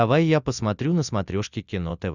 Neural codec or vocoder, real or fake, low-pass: none; real; 7.2 kHz